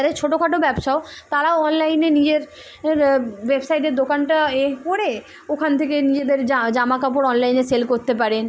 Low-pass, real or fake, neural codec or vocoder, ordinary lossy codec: none; real; none; none